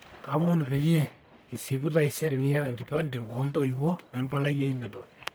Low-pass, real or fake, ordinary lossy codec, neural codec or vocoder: none; fake; none; codec, 44.1 kHz, 1.7 kbps, Pupu-Codec